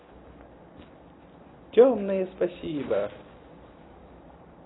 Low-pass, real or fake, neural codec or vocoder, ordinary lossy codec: 7.2 kHz; real; none; AAC, 16 kbps